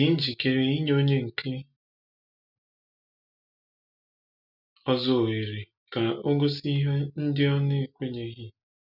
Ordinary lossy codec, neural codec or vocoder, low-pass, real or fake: none; none; 5.4 kHz; real